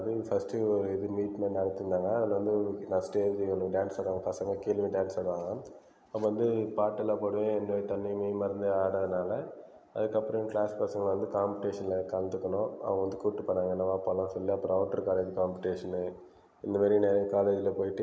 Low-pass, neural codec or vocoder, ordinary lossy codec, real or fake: none; none; none; real